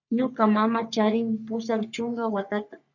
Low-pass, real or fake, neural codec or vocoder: 7.2 kHz; fake; codec, 44.1 kHz, 3.4 kbps, Pupu-Codec